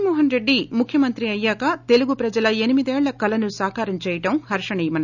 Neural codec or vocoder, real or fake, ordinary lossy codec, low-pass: none; real; none; 7.2 kHz